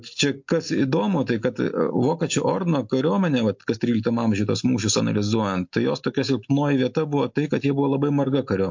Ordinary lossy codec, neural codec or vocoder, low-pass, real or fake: MP3, 48 kbps; none; 7.2 kHz; real